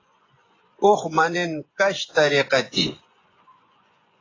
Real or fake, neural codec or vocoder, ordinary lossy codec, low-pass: fake; vocoder, 24 kHz, 100 mel bands, Vocos; AAC, 32 kbps; 7.2 kHz